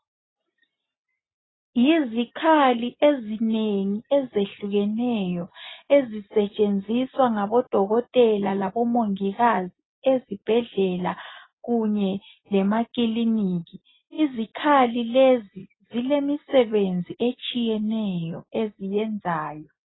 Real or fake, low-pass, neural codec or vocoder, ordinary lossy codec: real; 7.2 kHz; none; AAC, 16 kbps